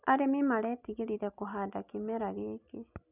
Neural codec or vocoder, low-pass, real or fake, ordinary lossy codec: none; 3.6 kHz; real; none